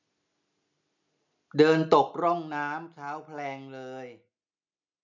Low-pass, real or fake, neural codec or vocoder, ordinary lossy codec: 7.2 kHz; real; none; none